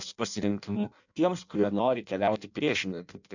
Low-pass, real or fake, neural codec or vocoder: 7.2 kHz; fake; codec, 16 kHz in and 24 kHz out, 0.6 kbps, FireRedTTS-2 codec